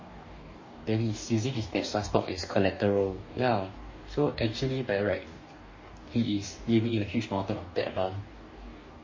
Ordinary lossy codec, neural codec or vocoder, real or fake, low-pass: MP3, 32 kbps; codec, 44.1 kHz, 2.6 kbps, DAC; fake; 7.2 kHz